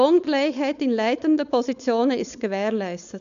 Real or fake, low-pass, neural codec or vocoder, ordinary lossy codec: fake; 7.2 kHz; codec, 16 kHz, 4.8 kbps, FACodec; none